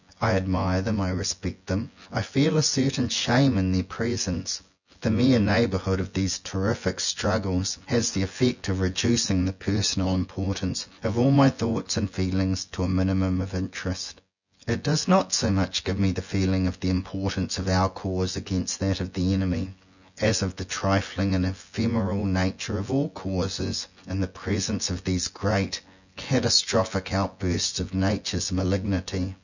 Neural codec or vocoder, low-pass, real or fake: vocoder, 24 kHz, 100 mel bands, Vocos; 7.2 kHz; fake